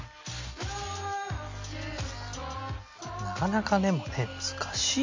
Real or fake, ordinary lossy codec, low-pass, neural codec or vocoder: real; MP3, 64 kbps; 7.2 kHz; none